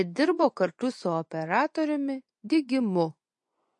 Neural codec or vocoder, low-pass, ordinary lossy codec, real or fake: vocoder, 24 kHz, 100 mel bands, Vocos; 10.8 kHz; MP3, 48 kbps; fake